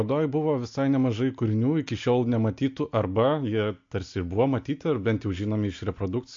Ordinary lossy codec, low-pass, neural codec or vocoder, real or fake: MP3, 48 kbps; 7.2 kHz; none; real